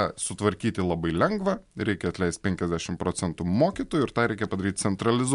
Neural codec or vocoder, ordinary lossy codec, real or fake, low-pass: none; MP3, 64 kbps; real; 10.8 kHz